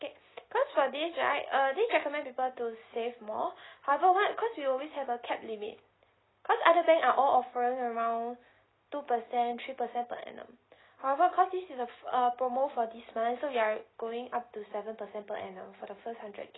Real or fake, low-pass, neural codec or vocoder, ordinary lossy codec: real; 7.2 kHz; none; AAC, 16 kbps